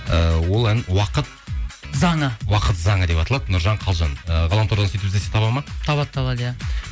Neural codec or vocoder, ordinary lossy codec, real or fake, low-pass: none; none; real; none